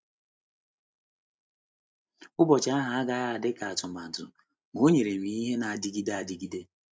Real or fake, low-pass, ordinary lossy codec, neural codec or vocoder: real; none; none; none